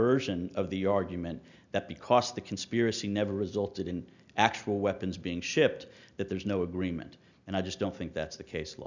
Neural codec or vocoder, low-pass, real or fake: none; 7.2 kHz; real